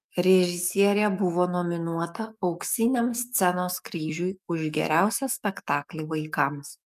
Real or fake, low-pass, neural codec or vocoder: fake; 14.4 kHz; codec, 44.1 kHz, 7.8 kbps, DAC